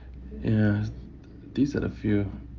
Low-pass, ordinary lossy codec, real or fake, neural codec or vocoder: 7.2 kHz; Opus, 32 kbps; real; none